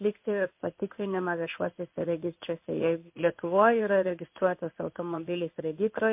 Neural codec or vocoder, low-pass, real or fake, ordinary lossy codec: codec, 16 kHz in and 24 kHz out, 1 kbps, XY-Tokenizer; 3.6 kHz; fake; MP3, 32 kbps